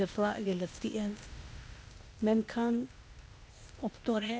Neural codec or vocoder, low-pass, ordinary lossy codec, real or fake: codec, 16 kHz, 0.8 kbps, ZipCodec; none; none; fake